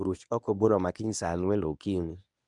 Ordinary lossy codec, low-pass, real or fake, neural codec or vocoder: none; 10.8 kHz; fake; codec, 24 kHz, 0.9 kbps, WavTokenizer, medium speech release version 1